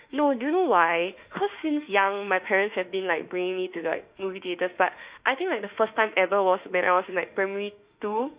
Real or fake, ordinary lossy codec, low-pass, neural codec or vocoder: fake; Opus, 64 kbps; 3.6 kHz; autoencoder, 48 kHz, 32 numbers a frame, DAC-VAE, trained on Japanese speech